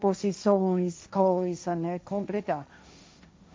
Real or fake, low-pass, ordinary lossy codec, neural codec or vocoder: fake; none; none; codec, 16 kHz, 1.1 kbps, Voila-Tokenizer